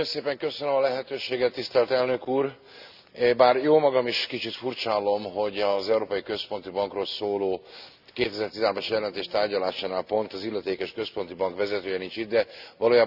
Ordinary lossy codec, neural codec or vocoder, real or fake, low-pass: none; none; real; 5.4 kHz